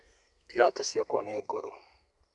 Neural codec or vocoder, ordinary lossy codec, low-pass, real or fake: codec, 32 kHz, 1.9 kbps, SNAC; none; 10.8 kHz; fake